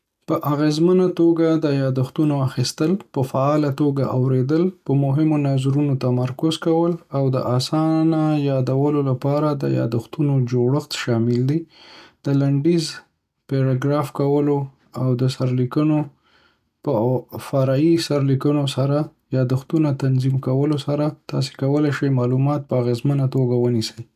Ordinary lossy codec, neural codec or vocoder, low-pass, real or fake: none; none; 14.4 kHz; real